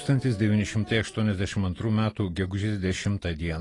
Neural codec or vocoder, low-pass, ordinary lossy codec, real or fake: none; 10.8 kHz; AAC, 32 kbps; real